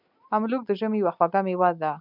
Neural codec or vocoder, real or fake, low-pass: none; real; 5.4 kHz